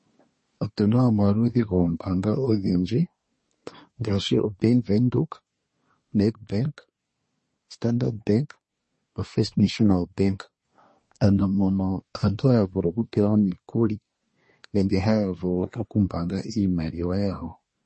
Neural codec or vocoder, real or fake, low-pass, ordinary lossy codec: codec, 24 kHz, 1 kbps, SNAC; fake; 10.8 kHz; MP3, 32 kbps